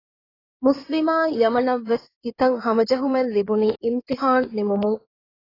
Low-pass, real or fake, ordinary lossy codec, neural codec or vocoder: 5.4 kHz; fake; AAC, 24 kbps; codec, 16 kHz in and 24 kHz out, 2.2 kbps, FireRedTTS-2 codec